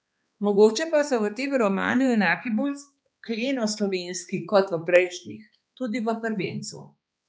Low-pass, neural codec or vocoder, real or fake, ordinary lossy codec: none; codec, 16 kHz, 2 kbps, X-Codec, HuBERT features, trained on balanced general audio; fake; none